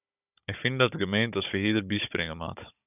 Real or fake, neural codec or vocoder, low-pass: fake; codec, 16 kHz, 16 kbps, FunCodec, trained on Chinese and English, 50 frames a second; 3.6 kHz